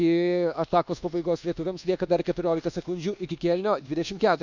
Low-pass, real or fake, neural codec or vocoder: 7.2 kHz; fake; codec, 24 kHz, 1.2 kbps, DualCodec